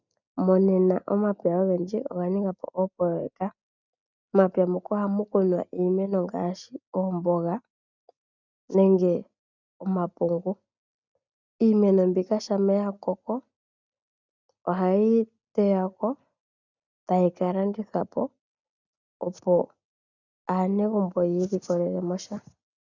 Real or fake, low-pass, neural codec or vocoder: real; 7.2 kHz; none